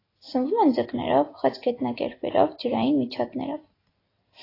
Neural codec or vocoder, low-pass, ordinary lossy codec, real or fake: none; 5.4 kHz; AAC, 24 kbps; real